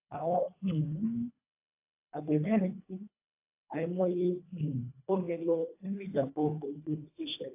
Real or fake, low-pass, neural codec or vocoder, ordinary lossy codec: fake; 3.6 kHz; codec, 24 kHz, 1.5 kbps, HILCodec; AAC, 24 kbps